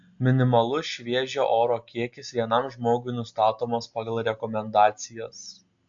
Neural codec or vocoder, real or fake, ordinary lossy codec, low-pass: none; real; AAC, 64 kbps; 7.2 kHz